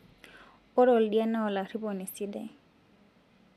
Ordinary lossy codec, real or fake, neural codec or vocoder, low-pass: none; real; none; 14.4 kHz